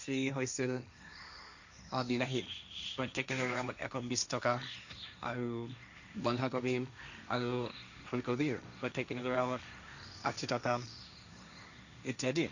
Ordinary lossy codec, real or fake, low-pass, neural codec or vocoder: none; fake; 7.2 kHz; codec, 16 kHz, 1.1 kbps, Voila-Tokenizer